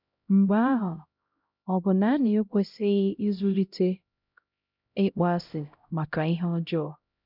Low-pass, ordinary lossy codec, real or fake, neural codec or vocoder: 5.4 kHz; none; fake; codec, 16 kHz, 0.5 kbps, X-Codec, HuBERT features, trained on LibriSpeech